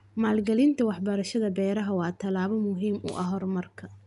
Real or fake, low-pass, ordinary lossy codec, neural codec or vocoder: real; 10.8 kHz; none; none